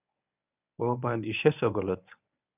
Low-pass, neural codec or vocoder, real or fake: 3.6 kHz; codec, 24 kHz, 0.9 kbps, WavTokenizer, medium speech release version 1; fake